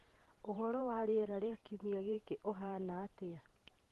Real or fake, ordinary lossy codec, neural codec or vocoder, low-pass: fake; Opus, 16 kbps; vocoder, 44.1 kHz, 128 mel bands every 512 samples, BigVGAN v2; 14.4 kHz